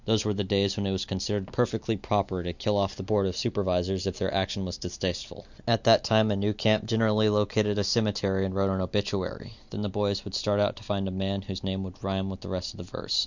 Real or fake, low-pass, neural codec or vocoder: real; 7.2 kHz; none